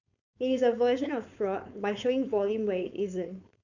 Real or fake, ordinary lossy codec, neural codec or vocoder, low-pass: fake; none; codec, 16 kHz, 4.8 kbps, FACodec; 7.2 kHz